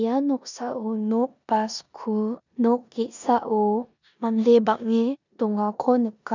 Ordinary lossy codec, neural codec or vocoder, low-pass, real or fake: none; codec, 16 kHz in and 24 kHz out, 0.9 kbps, LongCat-Audio-Codec, four codebook decoder; 7.2 kHz; fake